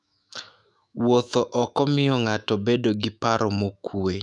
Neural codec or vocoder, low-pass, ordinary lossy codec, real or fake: autoencoder, 48 kHz, 128 numbers a frame, DAC-VAE, trained on Japanese speech; 10.8 kHz; none; fake